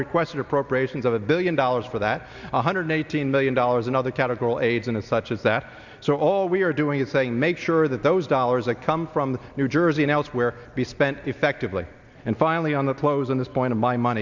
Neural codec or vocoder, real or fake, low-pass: none; real; 7.2 kHz